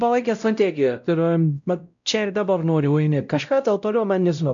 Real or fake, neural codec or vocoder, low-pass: fake; codec, 16 kHz, 0.5 kbps, X-Codec, WavLM features, trained on Multilingual LibriSpeech; 7.2 kHz